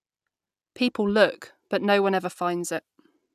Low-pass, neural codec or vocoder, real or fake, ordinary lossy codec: 14.4 kHz; none; real; none